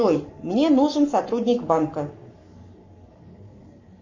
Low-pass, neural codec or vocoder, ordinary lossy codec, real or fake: 7.2 kHz; codec, 44.1 kHz, 7.8 kbps, Pupu-Codec; AAC, 48 kbps; fake